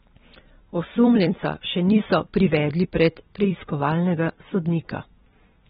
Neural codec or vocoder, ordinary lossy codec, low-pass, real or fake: vocoder, 44.1 kHz, 128 mel bands every 256 samples, BigVGAN v2; AAC, 16 kbps; 19.8 kHz; fake